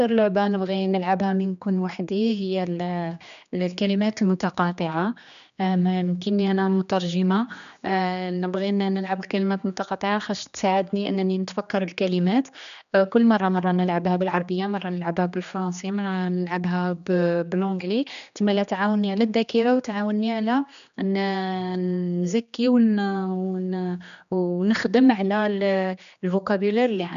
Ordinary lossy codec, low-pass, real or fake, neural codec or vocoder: none; 7.2 kHz; fake; codec, 16 kHz, 2 kbps, X-Codec, HuBERT features, trained on general audio